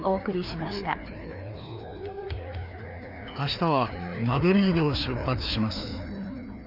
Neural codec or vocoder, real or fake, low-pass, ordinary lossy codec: codec, 16 kHz, 2 kbps, FreqCodec, larger model; fake; 5.4 kHz; none